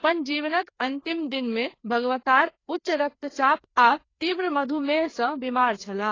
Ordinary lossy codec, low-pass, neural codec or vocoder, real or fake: AAC, 32 kbps; 7.2 kHz; codec, 16 kHz, 2 kbps, FreqCodec, larger model; fake